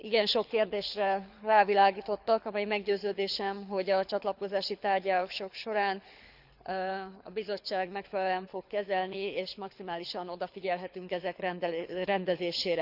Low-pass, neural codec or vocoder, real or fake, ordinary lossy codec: 5.4 kHz; codec, 24 kHz, 6 kbps, HILCodec; fake; Opus, 64 kbps